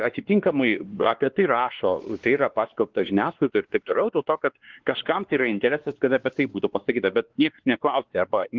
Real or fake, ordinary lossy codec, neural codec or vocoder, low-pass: fake; Opus, 16 kbps; codec, 16 kHz, 2 kbps, X-Codec, WavLM features, trained on Multilingual LibriSpeech; 7.2 kHz